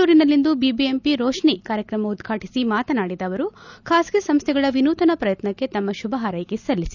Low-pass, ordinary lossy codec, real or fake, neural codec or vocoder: 7.2 kHz; none; real; none